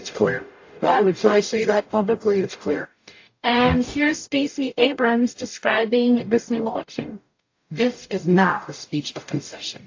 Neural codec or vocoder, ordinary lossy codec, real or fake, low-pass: codec, 44.1 kHz, 0.9 kbps, DAC; AAC, 48 kbps; fake; 7.2 kHz